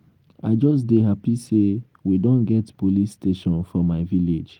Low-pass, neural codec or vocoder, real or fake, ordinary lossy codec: 19.8 kHz; none; real; Opus, 24 kbps